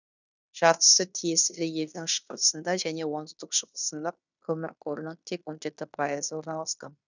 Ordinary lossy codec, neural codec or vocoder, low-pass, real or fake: none; codec, 16 kHz in and 24 kHz out, 0.9 kbps, LongCat-Audio-Codec, fine tuned four codebook decoder; 7.2 kHz; fake